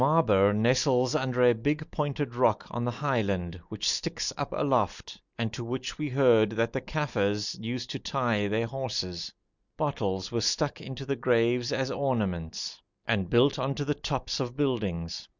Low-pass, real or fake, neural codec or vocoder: 7.2 kHz; real; none